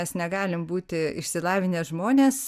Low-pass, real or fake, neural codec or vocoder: 14.4 kHz; real; none